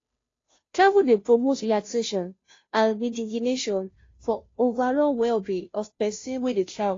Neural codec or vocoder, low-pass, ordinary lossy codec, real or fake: codec, 16 kHz, 0.5 kbps, FunCodec, trained on Chinese and English, 25 frames a second; 7.2 kHz; AAC, 32 kbps; fake